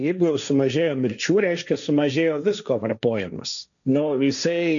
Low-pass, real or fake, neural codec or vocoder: 7.2 kHz; fake; codec, 16 kHz, 1.1 kbps, Voila-Tokenizer